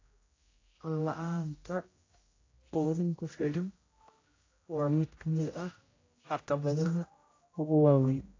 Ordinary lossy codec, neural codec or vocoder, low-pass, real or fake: AAC, 32 kbps; codec, 16 kHz, 0.5 kbps, X-Codec, HuBERT features, trained on general audio; 7.2 kHz; fake